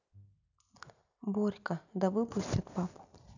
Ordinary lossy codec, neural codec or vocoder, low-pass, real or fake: none; none; 7.2 kHz; real